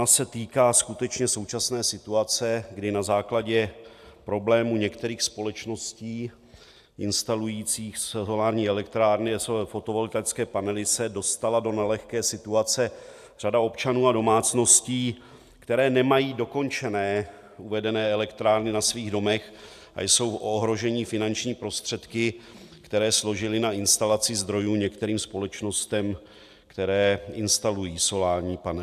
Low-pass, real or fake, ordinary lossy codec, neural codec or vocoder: 14.4 kHz; real; AAC, 96 kbps; none